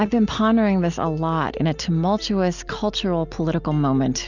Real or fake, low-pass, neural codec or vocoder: real; 7.2 kHz; none